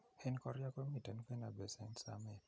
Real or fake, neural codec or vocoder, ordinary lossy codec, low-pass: real; none; none; none